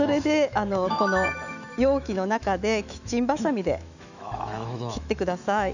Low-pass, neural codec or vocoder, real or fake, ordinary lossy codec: 7.2 kHz; autoencoder, 48 kHz, 128 numbers a frame, DAC-VAE, trained on Japanese speech; fake; none